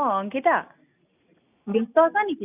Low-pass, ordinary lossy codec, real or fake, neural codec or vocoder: 3.6 kHz; none; real; none